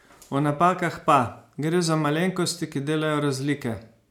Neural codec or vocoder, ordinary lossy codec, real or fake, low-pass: none; none; real; 19.8 kHz